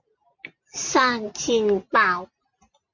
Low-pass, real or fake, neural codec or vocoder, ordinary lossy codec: 7.2 kHz; real; none; MP3, 48 kbps